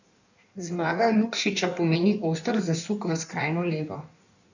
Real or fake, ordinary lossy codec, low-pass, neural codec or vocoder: fake; none; 7.2 kHz; codec, 16 kHz in and 24 kHz out, 1.1 kbps, FireRedTTS-2 codec